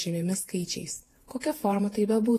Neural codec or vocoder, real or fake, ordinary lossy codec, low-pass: vocoder, 48 kHz, 128 mel bands, Vocos; fake; AAC, 48 kbps; 14.4 kHz